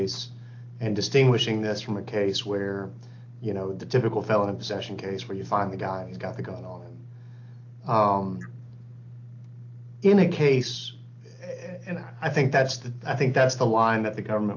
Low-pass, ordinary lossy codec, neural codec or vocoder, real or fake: 7.2 kHz; AAC, 48 kbps; none; real